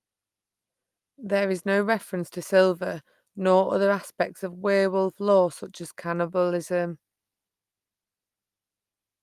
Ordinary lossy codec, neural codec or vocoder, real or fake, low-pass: Opus, 32 kbps; none; real; 14.4 kHz